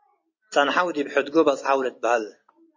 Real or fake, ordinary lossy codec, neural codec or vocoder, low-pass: real; MP3, 32 kbps; none; 7.2 kHz